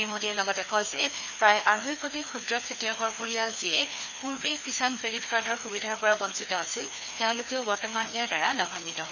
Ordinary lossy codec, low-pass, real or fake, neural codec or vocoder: none; 7.2 kHz; fake; codec, 16 kHz, 2 kbps, FreqCodec, larger model